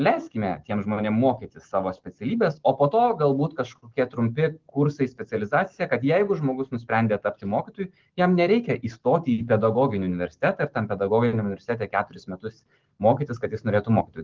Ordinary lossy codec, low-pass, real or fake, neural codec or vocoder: Opus, 16 kbps; 7.2 kHz; real; none